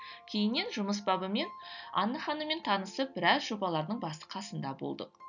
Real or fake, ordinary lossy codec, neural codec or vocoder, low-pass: real; none; none; 7.2 kHz